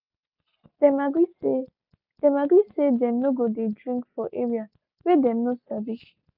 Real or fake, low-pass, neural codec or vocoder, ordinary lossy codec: real; 5.4 kHz; none; none